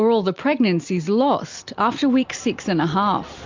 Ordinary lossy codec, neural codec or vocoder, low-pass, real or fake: MP3, 64 kbps; none; 7.2 kHz; real